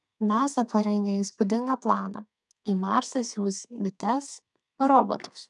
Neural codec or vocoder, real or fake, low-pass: codec, 32 kHz, 1.9 kbps, SNAC; fake; 10.8 kHz